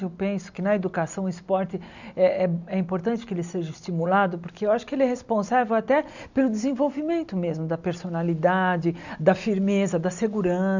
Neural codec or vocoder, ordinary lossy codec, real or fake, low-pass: none; none; real; 7.2 kHz